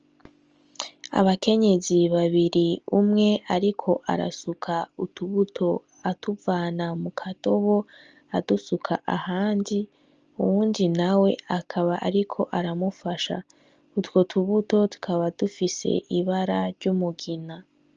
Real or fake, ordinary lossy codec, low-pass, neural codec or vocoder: real; Opus, 24 kbps; 7.2 kHz; none